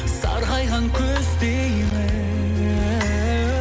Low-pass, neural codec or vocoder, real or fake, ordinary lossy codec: none; none; real; none